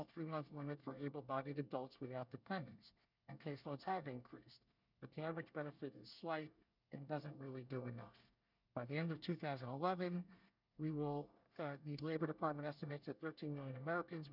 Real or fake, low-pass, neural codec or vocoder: fake; 5.4 kHz; codec, 24 kHz, 1 kbps, SNAC